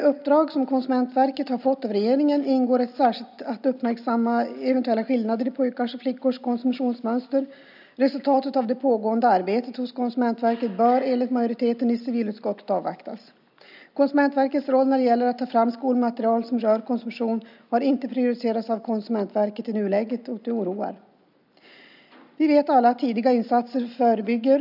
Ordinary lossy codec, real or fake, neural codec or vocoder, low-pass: none; real; none; 5.4 kHz